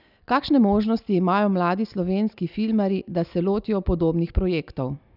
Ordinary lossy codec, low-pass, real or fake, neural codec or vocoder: none; 5.4 kHz; real; none